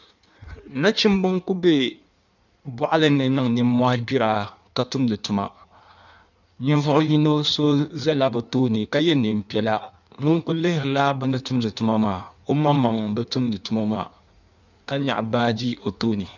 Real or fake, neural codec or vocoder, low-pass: fake; codec, 16 kHz in and 24 kHz out, 1.1 kbps, FireRedTTS-2 codec; 7.2 kHz